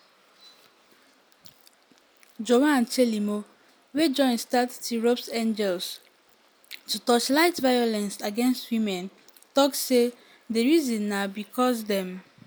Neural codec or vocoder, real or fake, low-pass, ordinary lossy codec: none; real; none; none